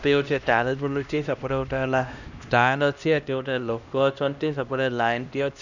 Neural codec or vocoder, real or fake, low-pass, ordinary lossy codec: codec, 16 kHz, 1 kbps, X-Codec, HuBERT features, trained on LibriSpeech; fake; 7.2 kHz; none